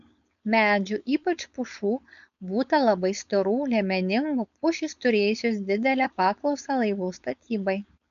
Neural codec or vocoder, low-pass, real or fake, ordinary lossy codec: codec, 16 kHz, 4.8 kbps, FACodec; 7.2 kHz; fake; MP3, 96 kbps